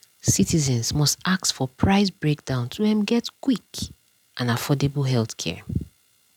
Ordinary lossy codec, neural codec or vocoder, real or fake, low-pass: none; none; real; 19.8 kHz